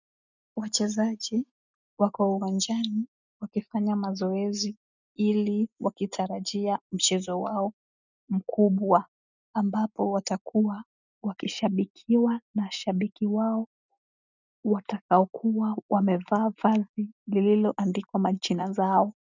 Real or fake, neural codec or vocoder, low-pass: real; none; 7.2 kHz